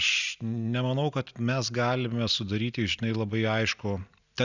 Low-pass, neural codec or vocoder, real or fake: 7.2 kHz; none; real